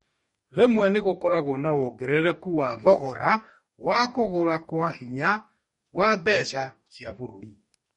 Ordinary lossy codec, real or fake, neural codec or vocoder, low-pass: MP3, 48 kbps; fake; codec, 44.1 kHz, 2.6 kbps, DAC; 19.8 kHz